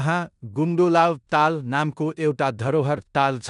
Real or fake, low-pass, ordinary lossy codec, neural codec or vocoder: fake; 10.8 kHz; none; codec, 16 kHz in and 24 kHz out, 0.9 kbps, LongCat-Audio-Codec, four codebook decoder